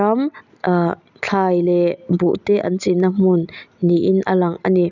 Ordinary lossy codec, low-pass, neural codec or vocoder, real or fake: none; 7.2 kHz; none; real